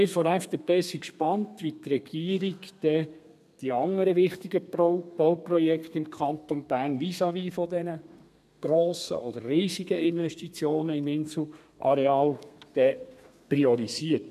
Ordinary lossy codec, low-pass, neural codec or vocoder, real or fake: none; 14.4 kHz; codec, 32 kHz, 1.9 kbps, SNAC; fake